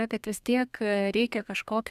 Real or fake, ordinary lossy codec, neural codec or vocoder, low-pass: fake; Opus, 64 kbps; codec, 32 kHz, 1.9 kbps, SNAC; 14.4 kHz